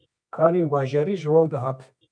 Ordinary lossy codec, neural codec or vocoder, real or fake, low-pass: MP3, 96 kbps; codec, 24 kHz, 0.9 kbps, WavTokenizer, medium music audio release; fake; 9.9 kHz